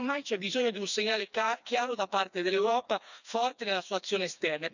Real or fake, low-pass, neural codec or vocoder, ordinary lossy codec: fake; 7.2 kHz; codec, 16 kHz, 2 kbps, FreqCodec, smaller model; none